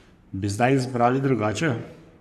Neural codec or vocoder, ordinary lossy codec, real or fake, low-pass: codec, 44.1 kHz, 3.4 kbps, Pupu-Codec; none; fake; 14.4 kHz